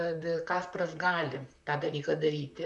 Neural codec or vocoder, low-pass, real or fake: vocoder, 44.1 kHz, 128 mel bands, Pupu-Vocoder; 10.8 kHz; fake